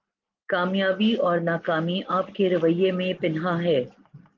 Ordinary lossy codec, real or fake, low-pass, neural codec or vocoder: Opus, 32 kbps; real; 7.2 kHz; none